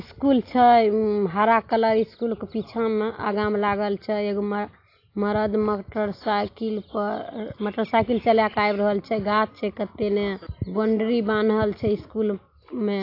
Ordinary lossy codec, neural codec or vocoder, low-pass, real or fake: AAC, 32 kbps; none; 5.4 kHz; real